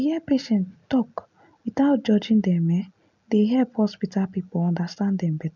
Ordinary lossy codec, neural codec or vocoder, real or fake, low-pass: none; none; real; 7.2 kHz